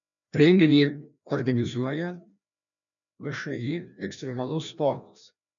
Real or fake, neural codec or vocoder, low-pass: fake; codec, 16 kHz, 1 kbps, FreqCodec, larger model; 7.2 kHz